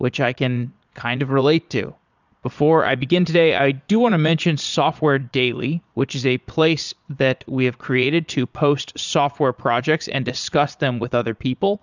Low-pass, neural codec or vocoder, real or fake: 7.2 kHz; vocoder, 22.05 kHz, 80 mel bands, Vocos; fake